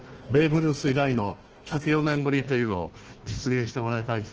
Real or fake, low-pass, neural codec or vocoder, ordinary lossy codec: fake; 7.2 kHz; codec, 16 kHz, 1 kbps, FunCodec, trained on Chinese and English, 50 frames a second; Opus, 16 kbps